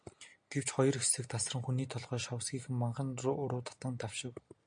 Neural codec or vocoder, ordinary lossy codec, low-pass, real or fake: none; MP3, 64 kbps; 9.9 kHz; real